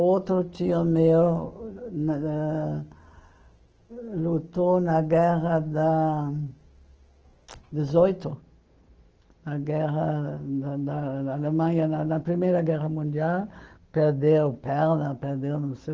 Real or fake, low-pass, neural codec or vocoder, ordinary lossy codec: fake; 7.2 kHz; autoencoder, 48 kHz, 128 numbers a frame, DAC-VAE, trained on Japanese speech; Opus, 16 kbps